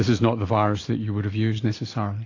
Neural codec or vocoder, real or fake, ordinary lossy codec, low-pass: none; real; AAC, 32 kbps; 7.2 kHz